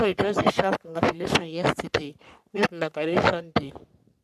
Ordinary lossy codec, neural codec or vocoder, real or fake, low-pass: none; codec, 44.1 kHz, 3.4 kbps, Pupu-Codec; fake; 14.4 kHz